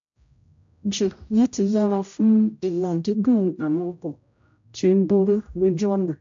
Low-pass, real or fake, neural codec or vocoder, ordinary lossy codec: 7.2 kHz; fake; codec, 16 kHz, 0.5 kbps, X-Codec, HuBERT features, trained on general audio; AAC, 64 kbps